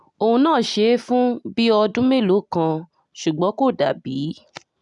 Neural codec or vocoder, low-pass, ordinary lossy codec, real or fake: none; 10.8 kHz; none; real